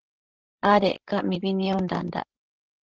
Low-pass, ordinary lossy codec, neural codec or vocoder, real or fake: 7.2 kHz; Opus, 16 kbps; codec, 16 kHz, 16 kbps, FreqCodec, larger model; fake